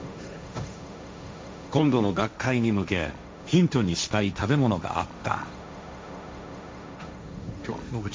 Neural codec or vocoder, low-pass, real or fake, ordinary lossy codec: codec, 16 kHz, 1.1 kbps, Voila-Tokenizer; none; fake; none